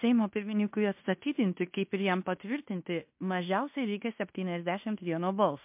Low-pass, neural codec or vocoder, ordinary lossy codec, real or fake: 3.6 kHz; codec, 16 kHz in and 24 kHz out, 0.9 kbps, LongCat-Audio-Codec, fine tuned four codebook decoder; MP3, 32 kbps; fake